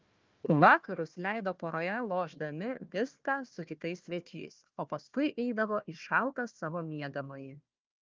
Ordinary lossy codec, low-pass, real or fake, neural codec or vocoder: Opus, 24 kbps; 7.2 kHz; fake; codec, 16 kHz, 1 kbps, FunCodec, trained on Chinese and English, 50 frames a second